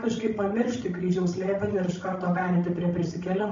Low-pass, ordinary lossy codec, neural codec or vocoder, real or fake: 7.2 kHz; MP3, 48 kbps; codec, 16 kHz, 16 kbps, FreqCodec, larger model; fake